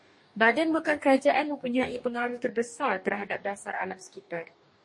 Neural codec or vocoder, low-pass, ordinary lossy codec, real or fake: codec, 44.1 kHz, 2.6 kbps, DAC; 10.8 kHz; MP3, 48 kbps; fake